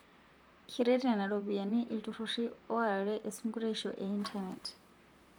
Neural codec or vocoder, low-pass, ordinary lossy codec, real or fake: vocoder, 44.1 kHz, 128 mel bands, Pupu-Vocoder; none; none; fake